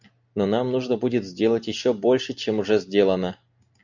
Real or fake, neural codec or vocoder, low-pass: real; none; 7.2 kHz